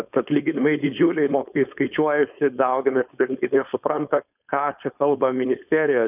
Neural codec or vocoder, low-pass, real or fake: codec, 16 kHz, 4.8 kbps, FACodec; 3.6 kHz; fake